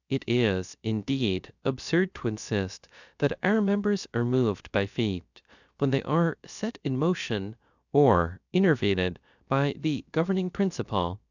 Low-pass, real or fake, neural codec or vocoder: 7.2 kHz; fake; codec, 16 kHz, 0.3 kbps, FocalCodec